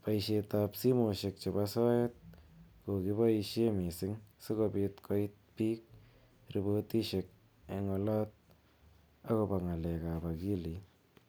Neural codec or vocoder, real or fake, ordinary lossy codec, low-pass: none; real; none; none